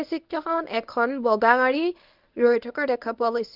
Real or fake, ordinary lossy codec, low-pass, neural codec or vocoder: fake; Opus, 24 kbps; 5.4 kHz; codec, 24 kHz, 0.9 kbps, WavTokenizer, medium speech release version 1